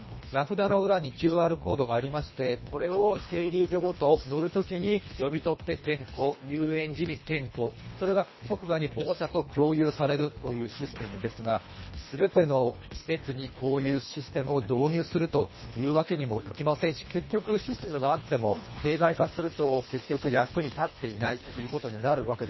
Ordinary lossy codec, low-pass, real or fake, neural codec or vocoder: MP3, 24 kbps; 7.2 kHz; fake; codec, 24 kHz, 1.5 kbps, HILCodec